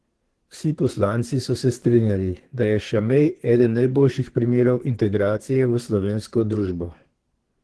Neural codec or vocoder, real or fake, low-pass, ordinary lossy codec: codec, 32 kHz, 1.9 kbps, SNAC; fake; 10.8 kHz; Opus, 16 kbps